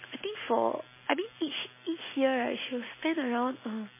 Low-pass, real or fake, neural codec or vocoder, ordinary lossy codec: 3.6 kHz; real; none; MP3, 16 kbps